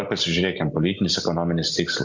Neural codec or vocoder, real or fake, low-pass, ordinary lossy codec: none; real; 7.2 kHz; MP3, 64 kbps